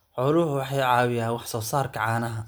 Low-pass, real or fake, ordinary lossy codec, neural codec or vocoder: none; real; none; none